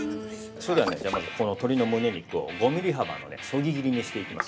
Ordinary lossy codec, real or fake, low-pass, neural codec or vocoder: none; real; none; none